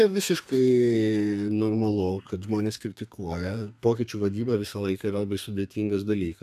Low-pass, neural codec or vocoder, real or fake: 14.4 kHz; codec, 32 kHz, 1.9 kbps, SNAC; fake